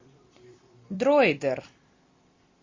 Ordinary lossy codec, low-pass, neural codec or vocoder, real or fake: MP3, 32 kbps; 7.2 kHz; vocoder, 44.1 kHz, 128 mel bands every 256 samples, BigVGAN v2; fake